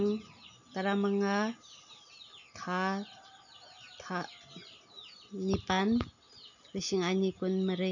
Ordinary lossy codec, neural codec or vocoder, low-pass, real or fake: none; none; 7.2 kHz; real